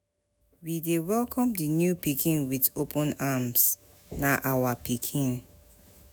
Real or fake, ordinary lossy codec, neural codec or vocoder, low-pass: fake; none; autoencoder, 48 kHz, 128 numbers a frame, DAC-VAE, trained on Japanese speech; none